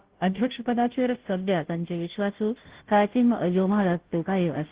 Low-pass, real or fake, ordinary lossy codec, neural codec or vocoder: 3.6 kHz; fake; Opus, 16 kbps; codec, 16 kHz, 0.5 kbps, FunCodec, trained on Chinese and English, 25 frames a second